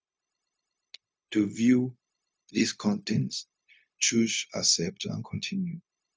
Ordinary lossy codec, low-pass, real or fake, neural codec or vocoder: none; none; fake; codec, 16 kHz, 0.4 kbps, LongCat-Audio-Codec